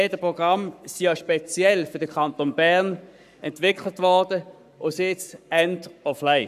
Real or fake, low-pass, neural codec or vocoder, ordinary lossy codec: fake; 14.4 kHz; codec, 44.1 kHz, 7.8 kbps, Pupu-Codec; none